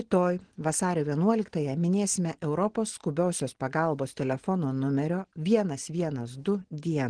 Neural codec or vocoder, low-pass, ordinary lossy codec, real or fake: none; 9.9 kHz; Opus, 16 kbps; real